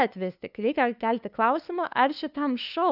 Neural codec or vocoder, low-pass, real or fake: codec, 16 kHz, 2 kbps, FunCodec, trained on LibriTTS, 25 frames a second; 5.4 kHz; fake